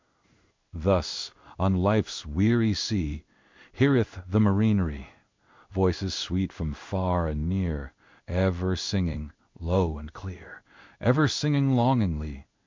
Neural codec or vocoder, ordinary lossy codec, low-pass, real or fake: codec, 16 kHz in and 24 kHz out, 1 kbps, XY-Tokenizer; MP3, 64 kbps; 7.2 kHz; fake